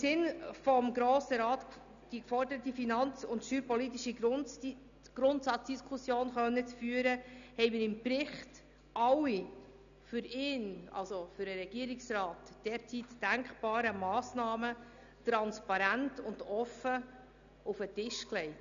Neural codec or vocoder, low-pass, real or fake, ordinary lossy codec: none; 7.2 kHz; real; none